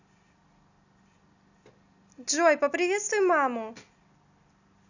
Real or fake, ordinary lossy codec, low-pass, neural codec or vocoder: real; none; 7.2 kHz; none